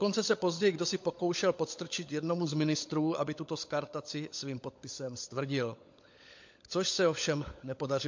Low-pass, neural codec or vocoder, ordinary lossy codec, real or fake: 7.2 kHz; codec, 16 kHz, 16 kbps, FunCodec, trained on LibriTTS, 50 frames a second; MP3, 48 kbps; fake